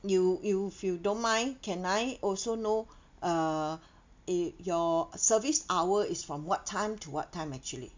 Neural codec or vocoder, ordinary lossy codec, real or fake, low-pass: none; MP3, 64 kbps; real; 7.2 kHz